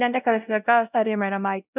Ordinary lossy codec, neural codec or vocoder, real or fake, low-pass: none; codec, 16 kHz, 0.5 kbps, X-Codec, WavLM features, trained on Multilingual LibriSpeech; fake; 3.6 kHz